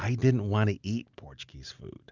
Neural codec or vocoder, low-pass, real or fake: none; 7.2 kHz; real